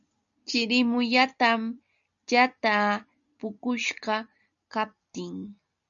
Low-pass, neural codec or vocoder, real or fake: 7.2 kHz; none; real